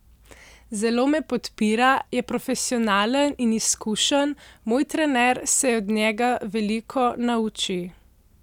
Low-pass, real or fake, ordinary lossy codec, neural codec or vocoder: 19.8 kHz; real; none; none